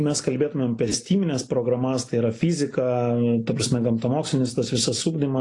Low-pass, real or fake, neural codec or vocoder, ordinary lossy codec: 10.8 kHz; real; none; AAC, 48 kbps